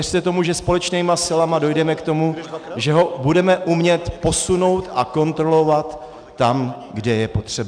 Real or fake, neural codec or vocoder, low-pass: real; none; 9.9 kHz